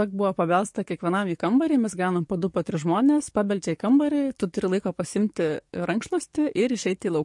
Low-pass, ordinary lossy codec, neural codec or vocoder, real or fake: 10.8 kHz; MP3, 48 kbps; codec, 44.1 kHz, 7.8 kbps, DAC; fake